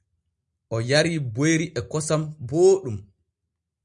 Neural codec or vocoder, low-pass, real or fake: none; 10.8 kHz; real